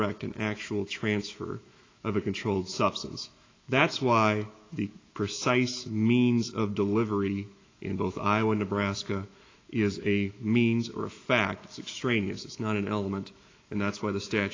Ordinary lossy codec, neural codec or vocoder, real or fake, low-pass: AAC, 32 kbps; autoencoder, 48 kHz, 128 numbers a frame, DAC-VAE, trained on Japanese speech; fake; 7.2 kHz